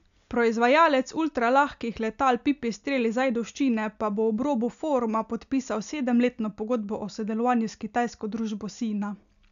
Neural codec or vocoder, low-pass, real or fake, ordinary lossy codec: none; 7.2 kHz; real; none